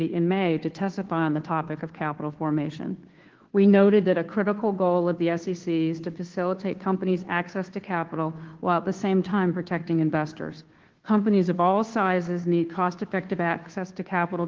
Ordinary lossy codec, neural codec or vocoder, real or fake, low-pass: Opus, 16 kbps; codec, 16 kHz, 2 kbps, FunCodec, trained on Chinese and English, 25 frames a second; fake; 7.2 kHz